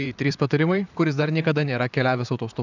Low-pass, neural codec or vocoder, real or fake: 7.2 kHz; vocoder, 44.1 kHz, 128 mel bands, Pupu-Vocoder; fake